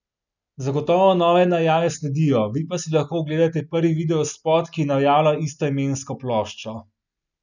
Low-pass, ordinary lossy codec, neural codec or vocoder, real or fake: 7.2 kHz; none; none; real